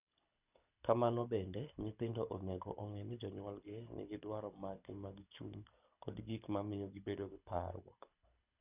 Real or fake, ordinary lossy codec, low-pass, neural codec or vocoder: fake; none; 3.6 kHz; codec, 24 kHz, 6 kbps, HILCodec